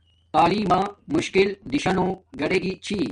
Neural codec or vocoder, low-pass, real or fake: none; 9.9 kHz; real